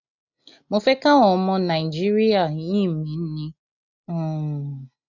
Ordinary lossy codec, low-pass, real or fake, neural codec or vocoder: none; 7.2 kHz; real; none